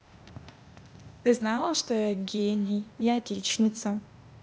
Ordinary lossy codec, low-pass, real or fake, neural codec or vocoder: none; none; fake; codec, 16 kHz, 0.8 kbps, ZipCodec